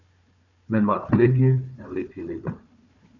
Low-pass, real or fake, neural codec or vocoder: 7.2 kHz; fake; codec, 16 kHz, 4 kbps, FunCodec, trained on Chinese and English, 50 frames a second